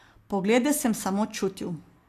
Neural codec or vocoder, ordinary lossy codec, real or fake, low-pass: none; AAC, 64 kbps; real; 14.4 kHz